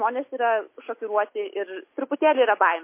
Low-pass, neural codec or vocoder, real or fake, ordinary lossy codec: 3.6 kHz; none; real; MP3, 24 kbps